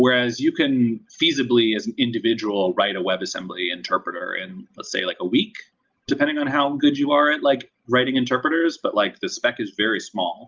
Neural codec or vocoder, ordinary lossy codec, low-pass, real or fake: none; Opus, 24 kbps; 7.2 kHz; real